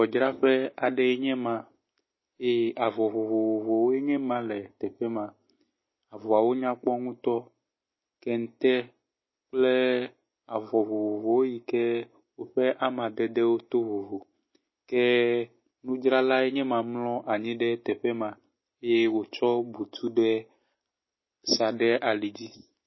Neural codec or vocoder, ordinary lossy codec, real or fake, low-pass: none; MP3, 24 kbps; real; 7.2 kHz